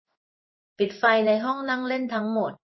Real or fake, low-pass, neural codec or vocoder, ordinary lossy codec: fake; 7.2 kHz; codec, 16 kHz in and 24 kHz out, 1 kbps, XY-Tokenizer; MP3, 24 kbps